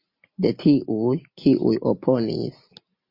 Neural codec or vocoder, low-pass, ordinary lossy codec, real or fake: none; 5.4 kHz; MP3, 32 kbps; real